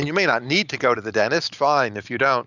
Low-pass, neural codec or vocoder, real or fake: 7.2 kHz; none; real